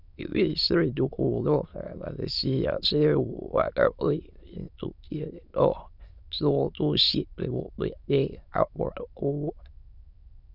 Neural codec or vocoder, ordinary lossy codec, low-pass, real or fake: autoencoder, 22.05 kHz, a latent of 192 numbers a frame, VITS, trained on many speakers; none; 5.4 kHz; fake